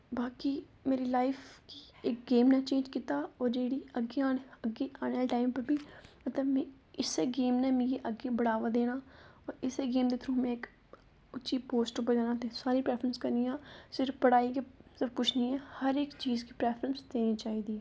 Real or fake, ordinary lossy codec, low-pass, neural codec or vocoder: real; none; none; none